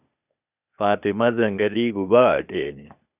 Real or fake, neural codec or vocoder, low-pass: fake; codec, 16 kHz, 0.8 kbps, ZipCodec; 3.6 kHz